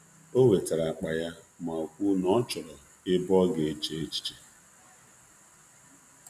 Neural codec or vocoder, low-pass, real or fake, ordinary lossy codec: none; 14.4 kHz; real; none